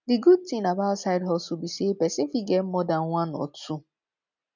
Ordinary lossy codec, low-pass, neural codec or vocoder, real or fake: none; 7.2 kHz; none; real